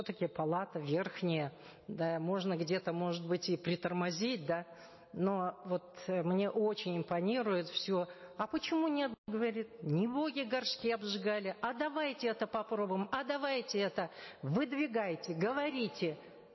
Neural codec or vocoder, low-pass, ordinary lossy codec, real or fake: none; 7.2 kHz; MP3, 24 kbps; real